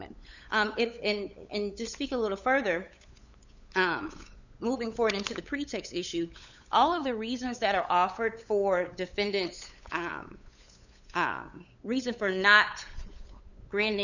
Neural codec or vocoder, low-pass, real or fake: codec, 16 kHz, 8 kbps, FunCodec, trained on LibriTTS, 25 frames a second; 7.2 kHz; fake